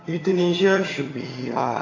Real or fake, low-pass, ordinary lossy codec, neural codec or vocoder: fake; 7.2 kHz; AAC, 32 kbps; vocoder, 22.05 kHz, 80 mel bands, HiFi-GAN